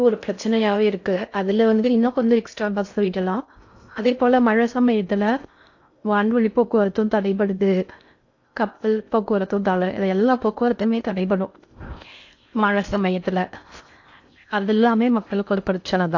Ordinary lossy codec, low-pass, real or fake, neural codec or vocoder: AAC, 48 kbps; 7.2 kHz; fake; codec, 16 kHz in and 24 kHz out, 0.6 kbps, FocalCodec, streaming, 4096 codes